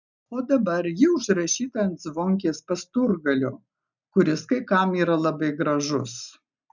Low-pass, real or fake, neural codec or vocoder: 7.2 kHz; real; none